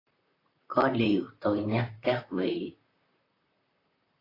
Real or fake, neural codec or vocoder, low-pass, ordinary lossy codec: fake; vocoder, 44.1 kHz, 128 mel bands, Pupu-Vocoder; 5.4 kHz; AAC, 24 kbps